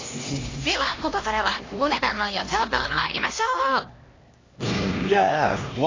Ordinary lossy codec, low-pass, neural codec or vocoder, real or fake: AAC, 32 kbps; 7.2 kHz; codec, 16 kHz, 1 kbps, X-Codec, HuBERT features, trained on LibriSpeech; fake